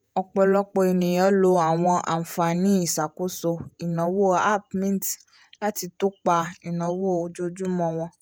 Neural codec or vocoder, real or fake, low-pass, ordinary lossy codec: vocoder, 48 kHz, 128 mel bands, Vocos; fake; none; none